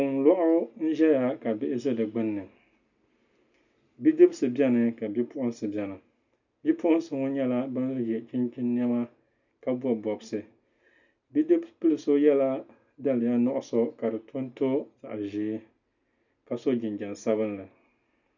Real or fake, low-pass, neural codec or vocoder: real; 7.2 kHz; none